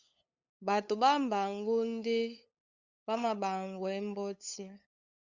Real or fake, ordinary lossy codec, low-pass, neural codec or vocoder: fake; Opus, 64 kbps; 7.2 kHz; codec, 16 kHz, 8 kbps, FunCodec, trained on LibriTTS, 25 frames a second